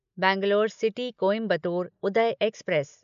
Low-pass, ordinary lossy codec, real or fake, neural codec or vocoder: 7.2 kHz; AAC, 96 kbps; real; none